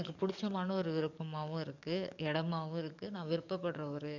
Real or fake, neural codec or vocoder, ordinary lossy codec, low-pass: fake; codec, 44.1 kHz, 7.8 kbps, DAC; none; 7.2 kHz